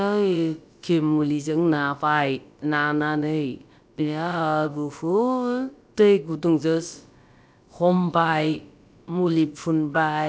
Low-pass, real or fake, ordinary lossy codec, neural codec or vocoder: none; fake; none; codec, 16 kHz, about 1 kbps, DyCAST, with the encoder's durations